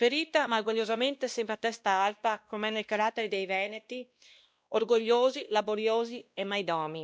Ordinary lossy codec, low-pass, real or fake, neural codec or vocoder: none; none; fake; codec, 16 kHz, 1 kbps, X-Codec, WavLM features, trained on Multilingual LibriSpeech